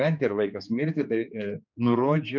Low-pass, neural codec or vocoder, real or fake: 7.2 kHz; codec, 16 kHz, 4 kbps, X-Codec, HuBERT features, trained on general audio; fake